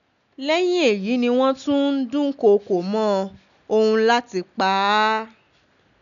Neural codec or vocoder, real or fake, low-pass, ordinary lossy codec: none; real; 7.2 kHz; none